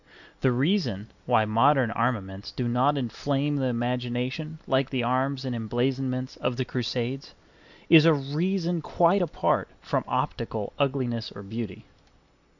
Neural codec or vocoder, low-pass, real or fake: none; 7.2 kHz; real